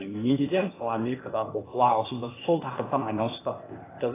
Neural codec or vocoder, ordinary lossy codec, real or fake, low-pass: codec, 16 kHz, 0.8 kbps, ZipCodec; AAC, 16 kbps; fake; 3.6 kHz